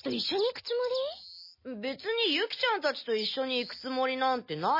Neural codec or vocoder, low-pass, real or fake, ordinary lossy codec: none; 5.4 kHz; real; MP3, 24 kbps